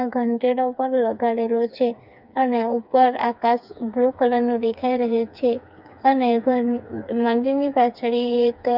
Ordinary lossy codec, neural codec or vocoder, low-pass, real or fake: none; codec, 16 kHz, 4 kbps, FreqCodec, smaller model; 5.4 kHz; fake